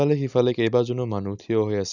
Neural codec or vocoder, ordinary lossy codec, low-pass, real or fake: none; none; 7.2 kHz; real